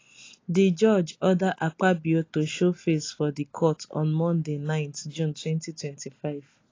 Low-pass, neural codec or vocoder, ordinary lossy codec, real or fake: 7.2 kHz; none; AAC, 32 kbps; real